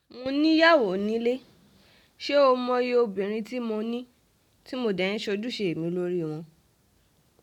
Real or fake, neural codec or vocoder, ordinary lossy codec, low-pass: real; none; none; 19.8 kHz